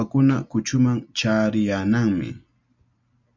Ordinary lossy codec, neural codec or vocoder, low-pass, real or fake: MP3, 64 kbps; none; 7.2 kHz; real